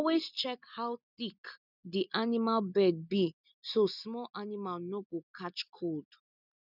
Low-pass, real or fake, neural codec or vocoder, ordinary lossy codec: 5.4 kHz; real; none; none